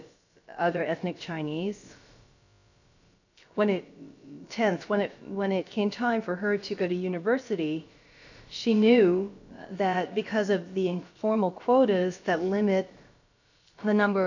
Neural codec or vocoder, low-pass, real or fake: codec, 16 kHz, about 1 kbps, DyCAST, with the encoder's durations; 7.2 kHz; fake